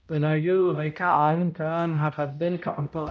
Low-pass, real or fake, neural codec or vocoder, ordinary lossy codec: none; fake; codec, 16 kHz, 0.5 kbps, X-Codec, HuBERT features, trained on balanced general audio; none